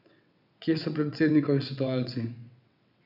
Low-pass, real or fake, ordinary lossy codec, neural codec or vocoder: 5.4 kHz; fake; none; vocoder, 22.05 kHz, 80 mel bands, WaveNeXt